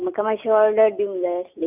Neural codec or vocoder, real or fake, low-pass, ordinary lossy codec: none; real; 3.6 kHz; none